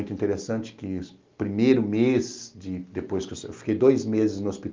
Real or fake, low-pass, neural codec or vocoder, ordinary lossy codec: real; 7.2 kHz; none; Opus, 24 kbps